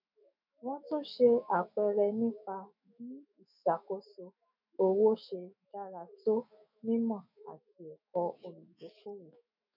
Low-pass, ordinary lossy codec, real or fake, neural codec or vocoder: 5.4 kHz; none; fake; autoencoder, 48 kHz, 128 numbers a frame, DAC-VAE, trained on Japanese speech